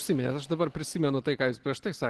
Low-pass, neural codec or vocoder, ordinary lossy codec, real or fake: 10.8 kHz; none; Opus, 16 kbps; real